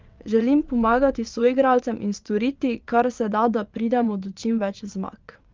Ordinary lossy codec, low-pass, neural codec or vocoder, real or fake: Opus, 24 kbps; 7.2 kHz; vocoder, 22.05 kHz, 80 mel bands, WaveNeXt; fake